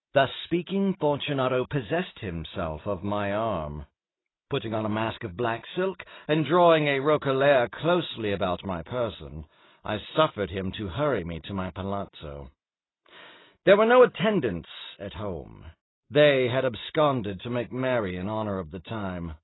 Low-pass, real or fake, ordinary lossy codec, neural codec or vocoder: 7.2 kHz; real; AAC, 16 kbps; none